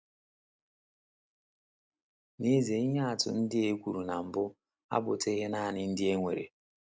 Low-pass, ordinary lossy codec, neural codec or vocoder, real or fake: none; none; none; real